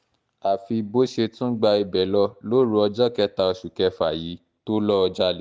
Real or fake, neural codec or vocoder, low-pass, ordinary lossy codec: real; none; none; none